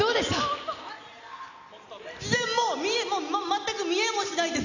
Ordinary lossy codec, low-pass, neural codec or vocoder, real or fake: none; 7.2 kHz; none; real